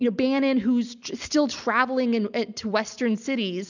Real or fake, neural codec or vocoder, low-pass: real; none; 7.2 kHz